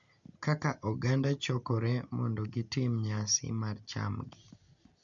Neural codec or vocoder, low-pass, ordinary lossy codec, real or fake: none; 7.2 kHz; MP3, 64 kbps; real